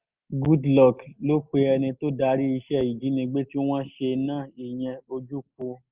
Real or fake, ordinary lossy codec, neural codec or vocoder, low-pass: real; Opus, 24 kbps; none; 3.6 kHz